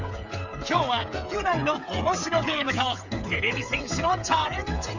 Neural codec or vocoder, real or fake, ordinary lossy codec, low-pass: codec, 16 kHz, 8 kbps, FreqCodec, smaller model; fake; none; 7.2 kHz